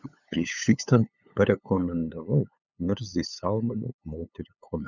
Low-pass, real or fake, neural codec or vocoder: 7.2 kHz; fake; codec, 16 kHz, 8 kbps, FunCodec, trained on LibriTTS, 25 frames a second